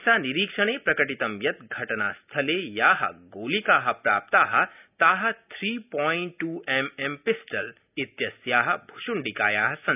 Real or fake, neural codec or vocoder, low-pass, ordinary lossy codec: real; none; 3.6 kHz; none